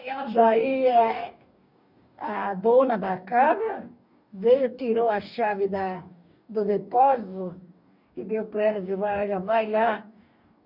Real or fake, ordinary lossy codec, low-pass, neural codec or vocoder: fake; none; 5.4 kHz; codec, 44.1 kHz, 2.6 kbps, DAC